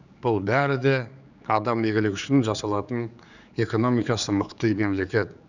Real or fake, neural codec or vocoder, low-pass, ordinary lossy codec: fake; codec, 16 kHz, 4 kbps, X-Codec, HuBERT features, trained on general audio; 7.2 kHz; none